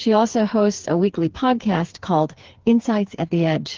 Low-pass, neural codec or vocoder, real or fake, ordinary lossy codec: 7.2 kHz; codec, 16 kHz, 4 kbps, FreqCodec, smaller model; fake; Opus, 16 kbps